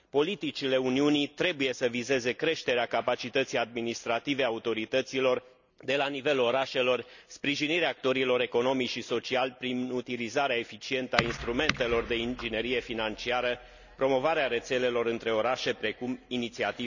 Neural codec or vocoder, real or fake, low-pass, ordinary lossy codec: none; real; 7.2 kHz; none